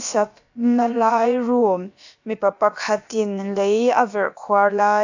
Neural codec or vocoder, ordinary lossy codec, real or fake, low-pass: codec, 16 kHz, about 1 kbps, DyCAST, with the encoder's durations; AAC, 48 kbps; fake; 7.2 kHz